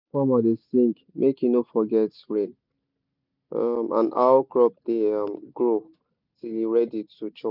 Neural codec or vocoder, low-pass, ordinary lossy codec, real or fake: none; 5.4 kHz; none; real